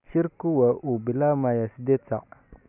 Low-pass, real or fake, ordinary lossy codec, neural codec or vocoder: 3.6 kHz; real; none; none